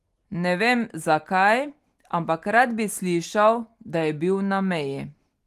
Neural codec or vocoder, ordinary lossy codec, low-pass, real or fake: none; Opus, 24 kbps; 14.4 kHz; real